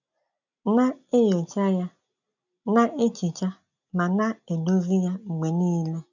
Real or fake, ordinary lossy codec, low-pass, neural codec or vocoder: real; none; 7.2 kHz; none